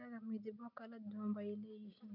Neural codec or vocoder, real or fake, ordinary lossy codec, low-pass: none; real; none; 5.4 kHz